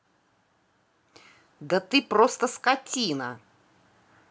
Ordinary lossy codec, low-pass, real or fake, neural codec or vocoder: none; none; real; none